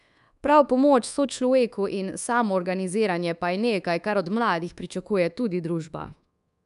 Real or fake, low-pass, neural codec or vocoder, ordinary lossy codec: fake; 10.8 kHz; codec, 24 kHz, 1.2 kbps, DualCodec; none